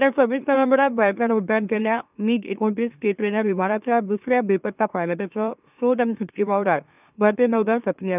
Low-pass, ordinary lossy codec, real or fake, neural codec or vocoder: 3.6 kHz; none; fake; autoencoder, 44.1 kHz, a latent of 192 numbers a frame, MeloTTS